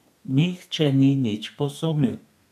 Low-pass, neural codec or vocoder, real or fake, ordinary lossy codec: 14.4 kHz; codec, 32 kHz, 1.9 kbps, SNAC; fake; none